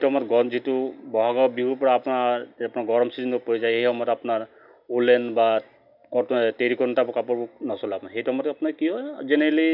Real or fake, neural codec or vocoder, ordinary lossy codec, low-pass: real; none; none; 5.4 kHz